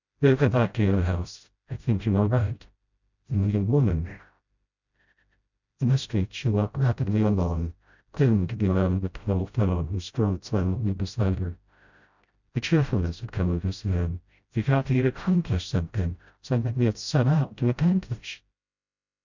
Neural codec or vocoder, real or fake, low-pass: codec, 16 kHz, 0.5 kbps, FreqCodec, smaller model; fake; 7.2 kHz